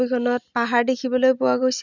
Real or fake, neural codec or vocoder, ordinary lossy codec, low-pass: real; none; none; 7.2 kHz